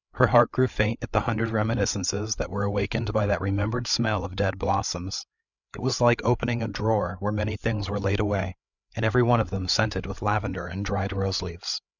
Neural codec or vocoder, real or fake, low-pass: codec, 16 kHz, 8 kbps, FreqCodec, larger model; fake; 7.2 kHz